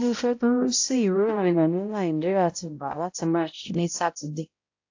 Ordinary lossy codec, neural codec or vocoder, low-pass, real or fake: AAC, 48 kbps; codec, 16 kHz, 0.5 kbps, X-Codec, HuBERT features, trained on balanced general audio; 7.2 kHz; fake